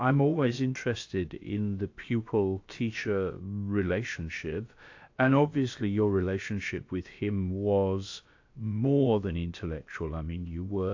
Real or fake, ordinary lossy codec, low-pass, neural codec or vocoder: fake; AAC, 48 kbps; 7.2 kHz; codec, 16 kHz, about 1 kbps, DyCAST, with the encoder's durations